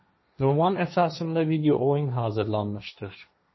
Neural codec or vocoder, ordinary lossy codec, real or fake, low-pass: codec, 16 kHz, 1.1 kbps, Voila-Tokenizer; MP3, 24 kbps; fake; 7.2 kHz